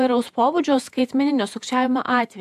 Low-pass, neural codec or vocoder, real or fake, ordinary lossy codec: 14.4 kHz; vocoder, 48 kHz, 128 mel bands, Vocos; fake; AAC, 96 kbps